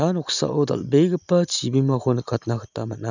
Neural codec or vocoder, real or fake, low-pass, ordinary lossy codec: none; real; 7.2 kHz; none